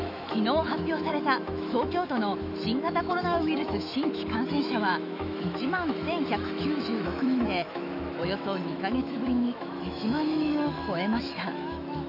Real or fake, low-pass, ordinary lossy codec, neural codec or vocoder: fake; 5.4 kHz; none; autoencoder, 48 kHz, 128 numbers a frame, DAC-VAE, trained on Japanese speech